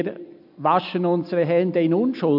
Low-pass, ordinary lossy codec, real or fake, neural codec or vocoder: 5.4 kHz; AAC, 48 kbps; real; none